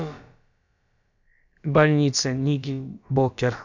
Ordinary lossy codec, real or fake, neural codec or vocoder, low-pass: none; fake; codec, 16 kHz, about 1 kbps, DyCAST, with the encoder's durations; 7.2 kHz